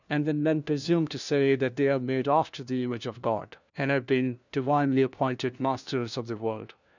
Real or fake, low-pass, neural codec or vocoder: fake; 7.2 kHz; codec, 16 kHz, 1 kbps, FunCodec, trained on LibriTTS, 50 frames a second